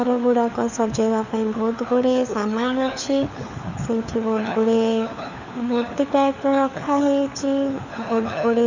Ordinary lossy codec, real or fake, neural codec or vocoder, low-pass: none; fake; codec, 16 kHz, 4 kbps, FunCodec, trained on LibriTTS, 50 frames a second; 7.2 kHz